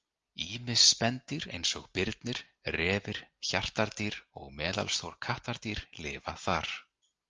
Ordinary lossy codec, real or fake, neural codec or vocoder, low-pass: Opus, 32 kbps; real; none; 7.2 kHz